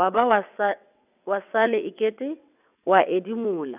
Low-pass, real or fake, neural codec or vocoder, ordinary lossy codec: 3.6 kHz; fake; vocoder, 22.05 kHz, 80 mel bands, WaveNeXt; none